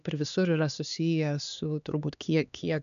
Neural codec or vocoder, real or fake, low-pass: codec, 16 kHz, 2 kbps, X-Codec, HuBERT features, trained on LibriSpeech; fake; 7.2 kHz